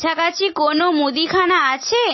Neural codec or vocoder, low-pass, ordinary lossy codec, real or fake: none; 7.2 kHz; MP3, 24 kbps; real